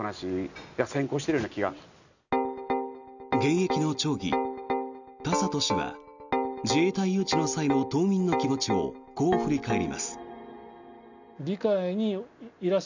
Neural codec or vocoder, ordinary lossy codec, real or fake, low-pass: none; none; real; 7.2 kHz